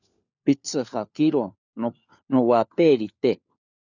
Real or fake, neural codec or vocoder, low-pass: fake; codec, 16 kHz, 4 kbps, FunCodec, trained on LibriTTS, 50 frames a second; 7.2 kHz